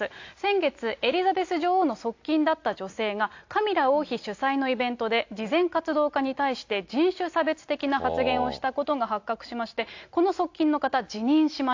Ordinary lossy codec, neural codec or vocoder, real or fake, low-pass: AAC, 48 kbps; none; real; 7.2 kHz